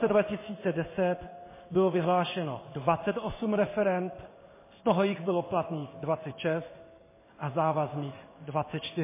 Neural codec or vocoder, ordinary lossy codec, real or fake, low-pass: codec, 16 kHz in and 24 kHz out, 1 kbps, XY-Tokenizer; MP3, 16 kbps; fake; 3.6 kHz